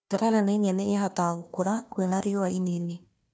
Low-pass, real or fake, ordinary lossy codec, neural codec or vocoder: none; fake; none; codec, 16 kHz, 1 kbps, FunCodec, trained on Chinese and English, 50 frames a second